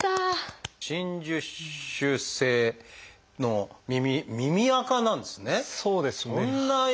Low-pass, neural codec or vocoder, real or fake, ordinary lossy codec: none; none; real; none